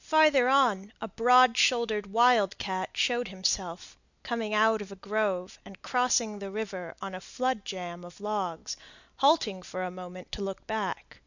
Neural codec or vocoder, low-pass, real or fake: none; 7.2 kHz; real